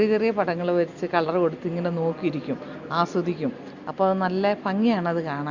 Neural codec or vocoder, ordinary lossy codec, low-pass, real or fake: none; Opus, 64 kbps; 7.2 kHz; real